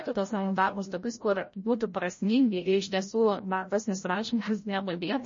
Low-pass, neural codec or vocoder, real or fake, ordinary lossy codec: 7.2 kHz; codec, 16 kHz, 0.5 kbps, FreqCodec, larger model; fake; MP3, 32 kbps